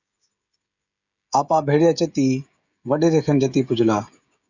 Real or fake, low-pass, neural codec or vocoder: fake; 7.2 kHz; codec, 16 kHz, 16 kbps, FreqCodec, smaller model